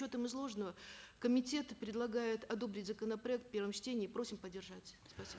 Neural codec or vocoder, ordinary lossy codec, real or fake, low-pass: none; none; real; none